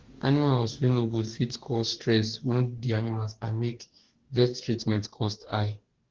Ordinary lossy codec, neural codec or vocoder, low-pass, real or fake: Opus, 16 kbps; codec, 44.1 kHz, 2.6 kbps, DAC; 7.2 kHz; fake